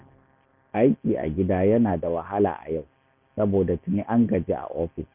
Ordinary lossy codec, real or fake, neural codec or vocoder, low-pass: none; real; none; 3.6 kHz